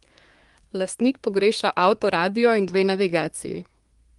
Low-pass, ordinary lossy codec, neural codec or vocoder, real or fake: 10.8 kHz; Opus, 32 kbps; codec, 24 kHz, 1 kbps, SNAC; fake